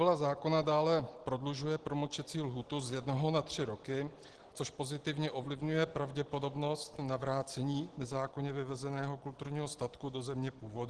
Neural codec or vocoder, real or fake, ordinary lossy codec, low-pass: none; real; Opus, 16 kbps; 10.8 kHz